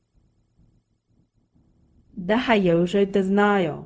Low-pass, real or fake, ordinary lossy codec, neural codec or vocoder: none; fake; none; codec, 16 kHz, 0.4 kbps, LongCat-Audio-Codec